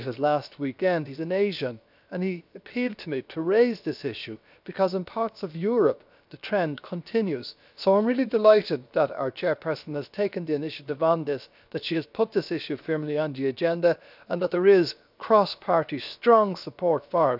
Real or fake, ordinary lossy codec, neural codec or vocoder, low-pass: fake; none; codec, 16 kHz, 0.7 kbps, FocalCodec; 5.4 kHz